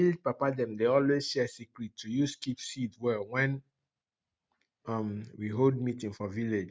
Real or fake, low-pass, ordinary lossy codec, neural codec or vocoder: real; none; none; none